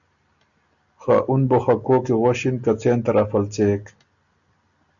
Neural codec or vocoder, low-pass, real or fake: none; 7.2 kHz; real